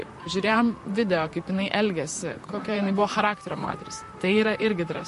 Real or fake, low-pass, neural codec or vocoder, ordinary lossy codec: fake; 14.4 kHz; vocoder, 44.1 kHz, 128 mel bands, Pupu-Vocoder; MP3, 48 kbps